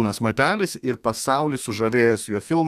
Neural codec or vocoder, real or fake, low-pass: codec, 32 kHz, 1.9 kbps, SNAC; fake; 14.4 kHz